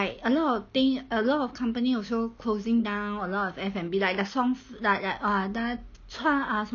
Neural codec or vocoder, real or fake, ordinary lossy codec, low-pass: none; real; none; 7.2 kHz